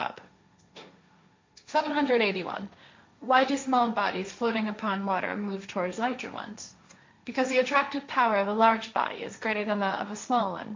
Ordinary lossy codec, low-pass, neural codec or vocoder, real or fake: MP3, 48 kbps; 7.2 kHz; codec, 16 kHz, 1.1 kbps, Voila-Tokenizer; fake